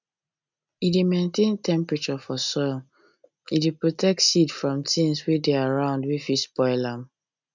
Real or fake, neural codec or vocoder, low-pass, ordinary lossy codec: real; none; 7.2 kHz; none